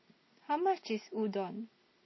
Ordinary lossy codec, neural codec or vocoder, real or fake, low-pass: MP3, 24 kbps; none; real; 7.2 kHz